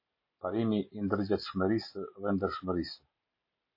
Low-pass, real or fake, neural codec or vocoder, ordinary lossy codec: 5.4 kHz; real; none; MP3, 24 kbps